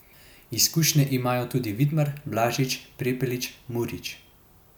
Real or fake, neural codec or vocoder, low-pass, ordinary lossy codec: real; none; none; none